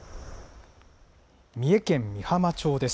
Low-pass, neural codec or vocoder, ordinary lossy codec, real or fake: none; none; none; real